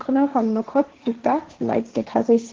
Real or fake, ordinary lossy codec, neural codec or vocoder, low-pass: fake; Opus, 16 kbps; codec, 16 kHz, 1.1 kbps, Voila-Tokenizer; 7.2 kHz